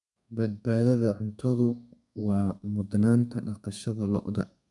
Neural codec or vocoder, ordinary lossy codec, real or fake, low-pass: codec, 32 kHz, 1.9 kbps, SNAC; MP3, 96 kbps; fake; 10.8 kHz